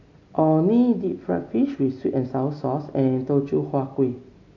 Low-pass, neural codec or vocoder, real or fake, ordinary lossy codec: 7.2 kHz; none; real; none